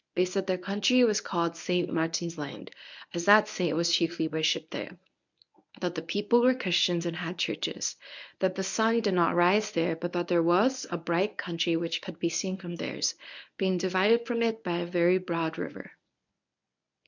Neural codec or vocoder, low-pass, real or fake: codec, 24 kHz, 0.9 kbps, WavTokenizer, medium speech release version 1; 7.2 kHz; fake